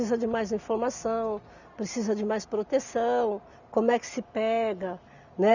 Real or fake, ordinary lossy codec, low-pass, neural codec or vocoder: real; none; 7.2 kHz; none